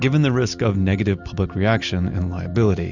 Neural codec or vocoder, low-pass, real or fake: none; 7.2 kHz; real